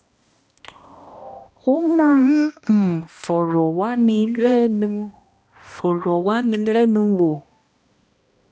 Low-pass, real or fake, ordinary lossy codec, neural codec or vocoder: none; fake; none; codec, 16 kHz, 1 kbps, X-Codec, HuBERT features, trained on balanced general audio